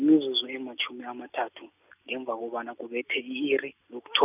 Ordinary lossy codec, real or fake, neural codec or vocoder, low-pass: none; real; none; 3.6 kHz